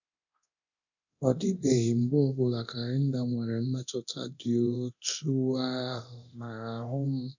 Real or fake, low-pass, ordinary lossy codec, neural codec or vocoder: fake; 7.2 kHz; none; codec, 24 kHz, 0.9 kbps, DualCodec